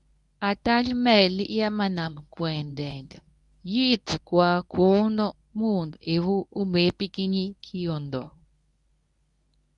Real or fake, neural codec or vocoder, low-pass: fake; codec, 24 kHz, 0.9 kbps, WavTokenizer, medium speech release version 1; 10.8 kHz